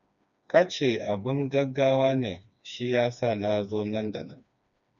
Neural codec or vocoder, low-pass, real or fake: codec, 16 kHz, 2 kbps, FreqCodec, smaller model; 7.2 kHz; fake